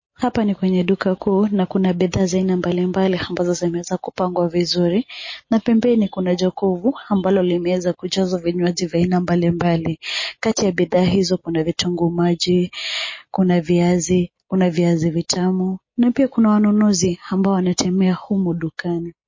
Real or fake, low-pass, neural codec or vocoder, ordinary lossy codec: real; 7.2 kHz; none; MP3, 32 kbps